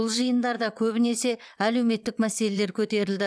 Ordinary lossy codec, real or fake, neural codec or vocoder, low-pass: none; fake; vocoder, 22.05 kHz, 80 mel bands, WaveNeXt; none